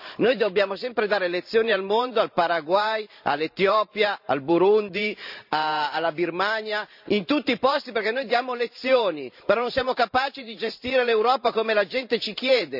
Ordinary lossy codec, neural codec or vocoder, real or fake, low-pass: none; vocoder, 44.1 kHz, 128 mel bands every 512 samples, BigVGAN v2; fake; 5.4 kHz